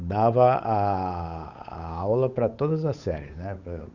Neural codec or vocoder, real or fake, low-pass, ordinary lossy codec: codec, 16 kHz, 8 kbps, FunCodec, trained on Chinese and English, 25 frames a second; fake; 7.2 kHz; Opus, 64 kbps